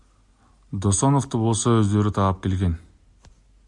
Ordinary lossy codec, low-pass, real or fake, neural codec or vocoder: MP3, 64 kbps; 10.8 kHz; real; none